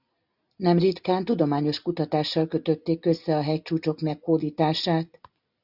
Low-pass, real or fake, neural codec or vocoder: 5.4 kHz; real; none